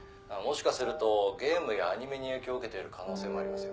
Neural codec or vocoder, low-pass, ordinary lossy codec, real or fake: none; none; none; real